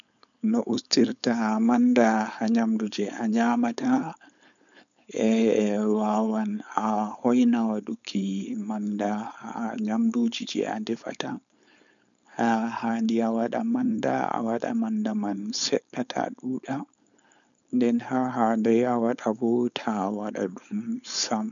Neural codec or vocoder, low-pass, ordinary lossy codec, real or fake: codec, 16 kHz, 4.8 kbps, FACodec; 7.2 kHz; none; fake